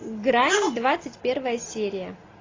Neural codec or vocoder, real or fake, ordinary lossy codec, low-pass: none; real; AAC, 32 kbps; 7.2 kHz